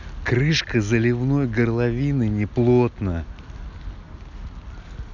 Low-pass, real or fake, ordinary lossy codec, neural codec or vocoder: 7.2 kHz; real; none; none